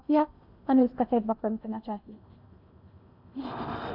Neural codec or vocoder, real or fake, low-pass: codec, 16 kHz in and 24 kHz out, 0.8 kbps, FocalCodec, streaming, 65536 codes; fake; 5.4 kHz